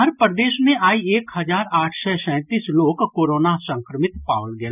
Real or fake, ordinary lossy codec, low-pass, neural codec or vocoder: real; none; 3.6 kHz; none